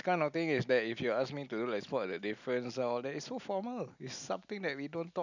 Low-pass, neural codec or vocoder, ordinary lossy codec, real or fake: 7.2 kHz; none; none; real